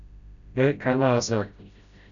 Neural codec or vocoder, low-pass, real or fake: codec, 16 kHz, 0.5 kbps, FreqCodec, smaller model; 7.2 kHz; fake